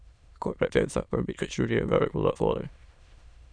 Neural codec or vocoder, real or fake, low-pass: autoencoder, 22.05 kHz, a latent of 192 numbers a frame, VITS, trained on many speakers; fake; 9.9 kHz